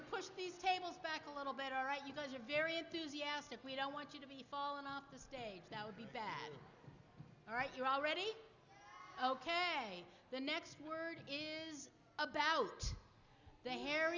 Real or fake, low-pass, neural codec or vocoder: real; 7.2 kHz; none